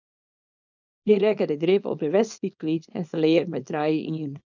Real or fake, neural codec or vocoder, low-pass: fake; codec, 24 kHz, 0.9 kbps, WavTokenizer, small release; 7.2 kHz